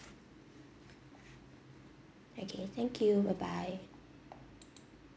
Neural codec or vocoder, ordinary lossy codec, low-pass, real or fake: none; none; none; real